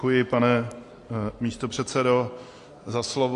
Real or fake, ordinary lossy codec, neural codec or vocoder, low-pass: real; AAC, 48 kbps; none; 10.8 kHz